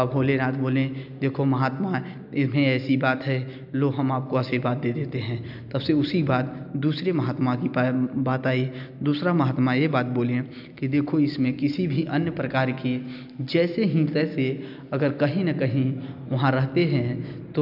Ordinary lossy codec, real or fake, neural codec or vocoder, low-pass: AAC, 48 kbps; real; none; 5.4 kHz